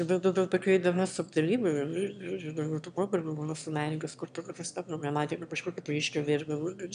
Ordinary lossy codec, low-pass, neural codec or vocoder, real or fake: MP3, 96 kbps; 9.9 kHz; autoencoder, 22.05 kHz, a latent of 192 numbers a frame, VITS, trained on one speaker; fake